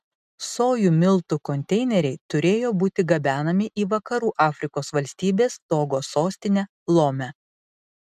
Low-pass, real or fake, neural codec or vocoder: 14.4 kHz; real; none